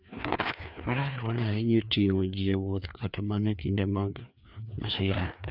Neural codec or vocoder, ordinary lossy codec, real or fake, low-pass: codec, 16 kHz, 2 kbps, FreqCodec, larger model; none; fake; 5.4 kHz